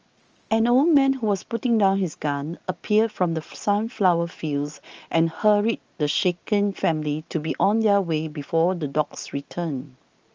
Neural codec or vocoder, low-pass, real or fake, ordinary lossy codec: none; 7.2 kHz; real; Opus, 24 kbps